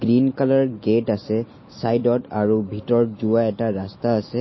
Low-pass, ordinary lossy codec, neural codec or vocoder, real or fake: 7.2 kHz; MP3, 24 kbps; none; real